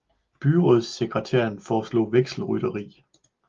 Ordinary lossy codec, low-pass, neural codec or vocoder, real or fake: Opus, 32 kbps; 7.2 kHz; none; real